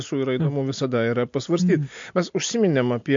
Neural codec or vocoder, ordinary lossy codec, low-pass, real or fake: none; MP3, 48 kbps; 7.2 kHz; real